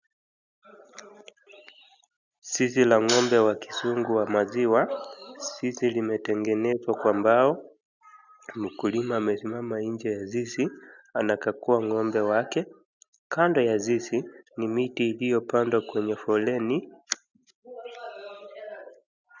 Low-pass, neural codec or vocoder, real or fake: 7.2 kHz; none; real